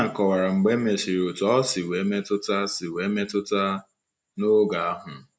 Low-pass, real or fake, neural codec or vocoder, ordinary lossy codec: none; real; none; none